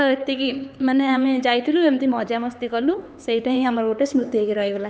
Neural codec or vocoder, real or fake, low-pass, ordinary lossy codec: codec, 16 kHz, 4 kbps, X-Codec, HuBERT features, trained on LibriSpeech; fake; none; none